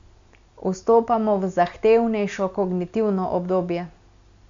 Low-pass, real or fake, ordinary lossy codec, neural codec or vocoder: 7.2 kHz; real; MP3, 64 kbps; none